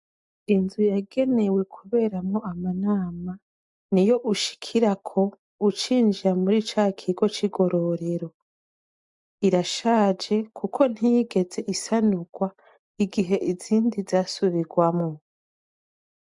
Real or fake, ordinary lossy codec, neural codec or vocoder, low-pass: real; MP3, 64 kbps; none; 10.8 kHz